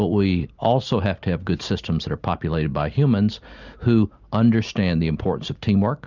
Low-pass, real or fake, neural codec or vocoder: 7.2 kHz; real; none